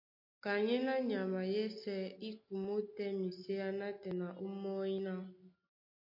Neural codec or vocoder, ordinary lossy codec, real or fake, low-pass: none; MP3, 32 kbps; real; 5.4 kHz